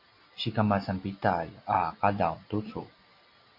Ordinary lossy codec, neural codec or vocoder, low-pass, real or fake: MP3, 32 kbps; none; 5.4 kHz; real